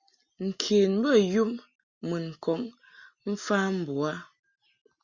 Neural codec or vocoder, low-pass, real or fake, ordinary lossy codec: none; 7.2 kHz; real; Opus, 64 kbps